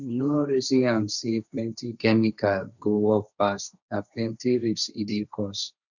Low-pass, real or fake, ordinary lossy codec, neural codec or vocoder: 7.2 kHz; fake; none; codec, 16 kHz, 1.1 kbps, Voila-Tokenizer